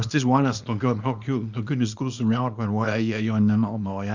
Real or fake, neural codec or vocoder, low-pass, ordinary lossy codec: fake; codec, 24 kHz, 0.9 kbps, WavTokenizer, small release; 7.2 kHz; Opus, 64 kbps